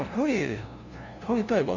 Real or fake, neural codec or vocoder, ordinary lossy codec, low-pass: fake; codec, 16 kHz, 0.5 kbps, FunCodec, trained on LibriTTS, 25 frames a second; none; 7.2 kHz